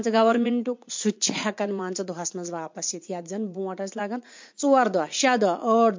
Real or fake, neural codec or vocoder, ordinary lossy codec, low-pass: fake; vocoder, 44.1 kHz, 80 mel bands, Vocos; MP3, 48 kbps; 7.2 kHz